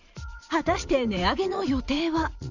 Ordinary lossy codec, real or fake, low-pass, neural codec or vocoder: none; fake; 7.2 kHz; vocoder, 44.1 kHz, 80 mel bands, Vocos